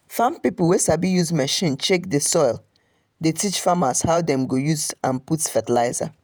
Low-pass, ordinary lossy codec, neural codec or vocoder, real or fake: none; none; vocoder, 48 kHz, 128 mel bands, Vocos; fake